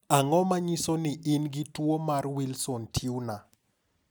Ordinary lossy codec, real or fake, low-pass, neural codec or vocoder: none; real; none; none